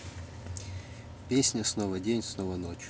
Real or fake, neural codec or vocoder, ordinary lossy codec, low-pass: real; none; none; none